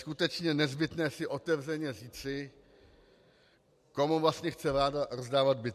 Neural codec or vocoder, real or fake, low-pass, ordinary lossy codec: none; real; 14.4 kHz; MP3, 64 kbps